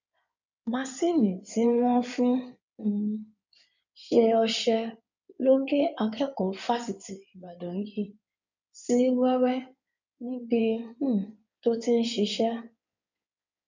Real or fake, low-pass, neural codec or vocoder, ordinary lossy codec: fake; 7.2 kHz; codec, 16 kHz in and 24 kHz out, 2.2 kbps, FireRedTTS-2 codec; none